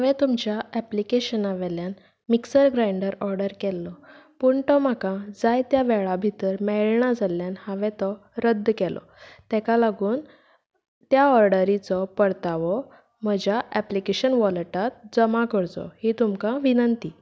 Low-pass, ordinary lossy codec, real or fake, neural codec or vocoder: none; none; real; none